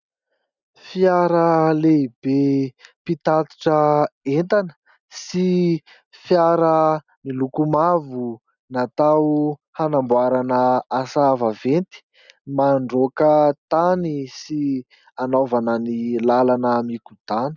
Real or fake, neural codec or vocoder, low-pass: real; none; 7.2 kHz